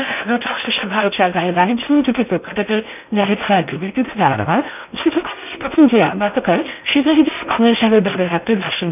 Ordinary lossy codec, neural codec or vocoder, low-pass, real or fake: none; codec, 16 kHz in and 24 kHz out, 0.6 kbps, FocalCodec, streaming, 4096 codes; 3.6 kHz; fake